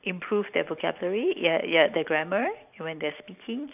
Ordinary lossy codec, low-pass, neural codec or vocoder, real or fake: none; 3.6 kHz; vocoder, 44.1 kHz, 128 mel bands every 512 samples, BigVGAN v2; fake